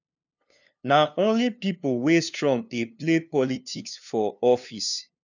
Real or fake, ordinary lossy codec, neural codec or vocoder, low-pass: fake; none; codec, 16 kHz, 2 kbps, FunCodec, trained on LibriTTS, 25 frames a second; 7.2 kHz